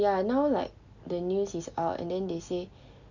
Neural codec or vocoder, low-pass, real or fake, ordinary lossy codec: none; 7.2 kHz; real; none